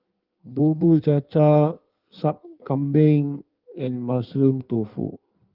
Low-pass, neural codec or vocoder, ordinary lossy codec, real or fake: 5.4 kHz; codec, 16 kHz in and 24 kHz out, 1.1 kbps, FireRedTTS-2 codec; Opus, 24 kbps; fake